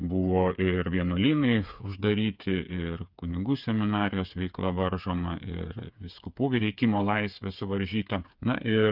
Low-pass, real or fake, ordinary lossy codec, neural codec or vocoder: 5.4 kHz; fake; Opus, 64 kbps; codec, 16 kHz, 8 kbps, FreqCodec, smaller model